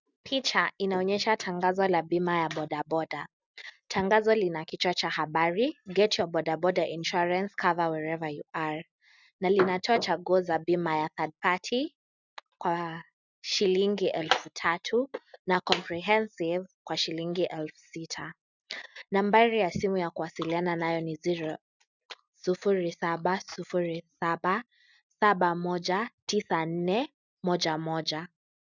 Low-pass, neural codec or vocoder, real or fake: 7.2 kHz; none; real